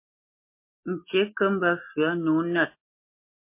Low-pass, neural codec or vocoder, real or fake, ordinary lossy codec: 3.6 kHz; none; real; MP3, 24 kbps